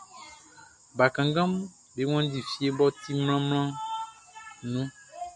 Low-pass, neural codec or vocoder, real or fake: 10.8 kHz; none; real